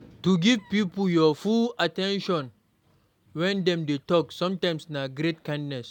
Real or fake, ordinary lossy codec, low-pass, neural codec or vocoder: real; none; 19.8 kHz; none